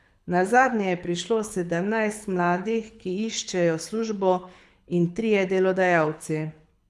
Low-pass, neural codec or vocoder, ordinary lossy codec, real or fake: none; codec, 24 kHz, 6 kbps, HILCodec; none; fake